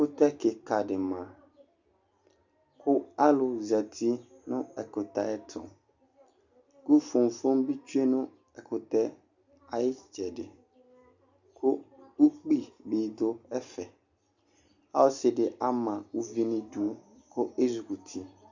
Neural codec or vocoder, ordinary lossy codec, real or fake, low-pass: none; Opus, 64 kbps; real; 7.2 kHz